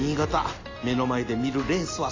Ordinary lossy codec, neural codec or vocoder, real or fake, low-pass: AAC, 32 kbps; none; real; 7.2 kHz